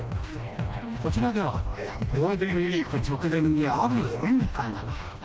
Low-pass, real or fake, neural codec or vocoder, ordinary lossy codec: none; fake; codec, 16 kHz, 1 kbps, FreqCodec, smaller model; none